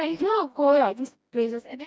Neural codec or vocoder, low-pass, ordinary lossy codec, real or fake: codec, 16 kHz, 1 kbps, FreqCodec, smaller model; none; none; fake